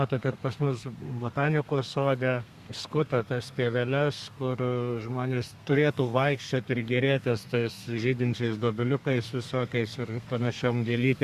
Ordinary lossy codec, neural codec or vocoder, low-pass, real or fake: Opus, 64 kbps; codec, 32 kHz, 1.9 kbps, SNAC; 14.4 kHz; fake